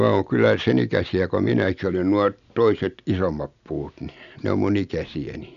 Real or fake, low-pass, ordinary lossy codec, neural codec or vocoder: real; 7.2 kHz; none; none